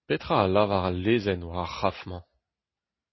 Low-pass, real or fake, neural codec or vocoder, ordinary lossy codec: 7.2 kHz; real; none; MP3, 24 kbps